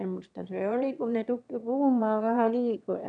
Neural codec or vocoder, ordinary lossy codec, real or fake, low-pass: autoencoder, 22.05 kHz, a latent of 192 numbers a frame, VITS, trained on one speaker; none; fake; 9.9 kHz